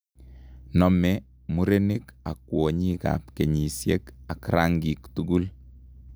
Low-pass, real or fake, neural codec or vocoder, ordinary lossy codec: none; real; none; none